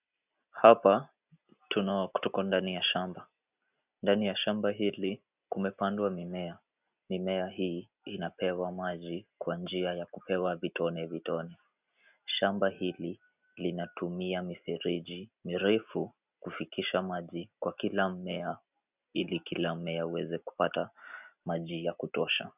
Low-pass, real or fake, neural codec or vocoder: 3.6 kHz; real; none